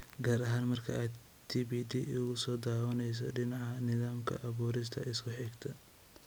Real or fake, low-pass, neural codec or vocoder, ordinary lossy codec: real; none; none; none